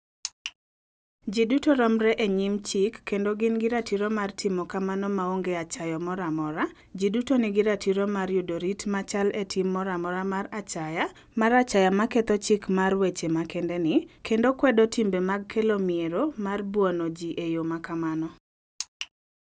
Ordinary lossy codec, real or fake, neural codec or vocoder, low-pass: none; real; none; none